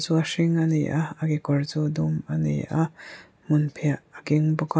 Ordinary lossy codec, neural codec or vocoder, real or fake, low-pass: none; none; real; none